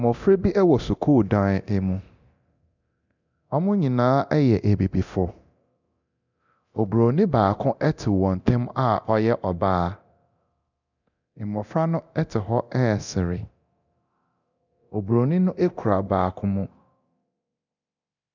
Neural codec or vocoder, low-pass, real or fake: codec, 24 kHz, 0.9 kbps, DualCodec; 7.2 kHz; fake